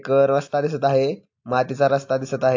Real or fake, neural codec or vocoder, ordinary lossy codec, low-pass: real; none; AAC, 48 kbps; 7.2 kHz